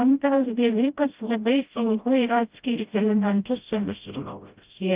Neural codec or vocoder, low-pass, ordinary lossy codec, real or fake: codec, 16 kHz, 0.5 kbps, FreqCodec, smaller model; 3.6 kHz; Opus, 32 kbps; fake